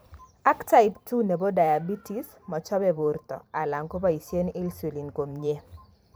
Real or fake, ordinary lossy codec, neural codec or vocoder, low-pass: fake; none; vocoder, 44.1 kHz, 128 mel bands every 512 samples, BigVGAN v2; none